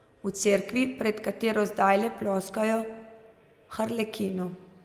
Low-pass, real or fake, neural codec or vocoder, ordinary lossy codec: 14.4 kHz; real; none; Opus, 32 kbps